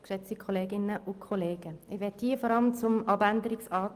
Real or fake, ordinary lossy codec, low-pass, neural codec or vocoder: real; Opus, 32 kbps; 14.4 kHz; none